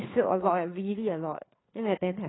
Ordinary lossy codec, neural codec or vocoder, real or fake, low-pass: AAC, 16 kbps; codec, 16 kHz, 4 kbps, FreqCodec, larger model; fake; 7.2 kHz